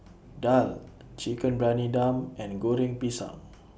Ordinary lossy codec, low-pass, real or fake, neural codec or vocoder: none; none; real; none